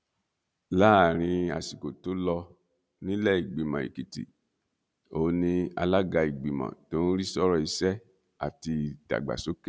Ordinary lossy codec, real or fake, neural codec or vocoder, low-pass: none; real; none; none